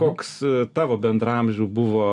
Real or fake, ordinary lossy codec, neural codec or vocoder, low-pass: real; MP3, 96 kbps; none; 10.8 kHz